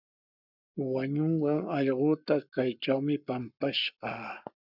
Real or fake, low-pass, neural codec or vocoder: fake; 5.4 kHz; codec, 44.1 kHz, 7.8 kbps, Pupu-Codec